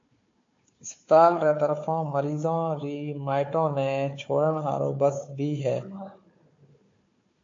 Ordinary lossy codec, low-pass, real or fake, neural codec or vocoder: AAC, 48 kbps; 7.2 kHz; fake; codec, 16 kHz, 4 kbps, FunCodec, trained on Chinese and English, 50 frames a second